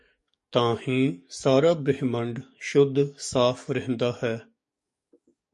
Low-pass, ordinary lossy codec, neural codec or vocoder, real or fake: 10.8 kHz; MP3, 48 kbps; codec, 44.1 kHz, 7.8 kbps, DAC; fake